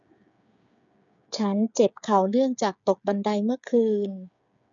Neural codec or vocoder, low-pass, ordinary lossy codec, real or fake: codec, 16 kHz, 8 kbps, FreqCodec, smaller model; 7.2 kHz; none; fake